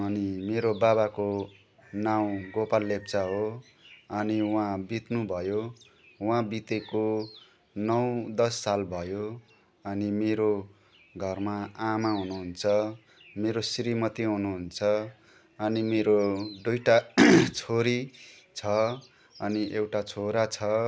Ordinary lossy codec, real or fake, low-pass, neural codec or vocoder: none; real; none; none